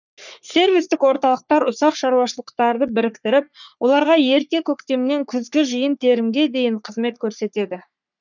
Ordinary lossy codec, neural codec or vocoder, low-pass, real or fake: none; codec, 44.1 kHz, 3.4 kbps, Pupu-Codec; 7.2 kHz; fake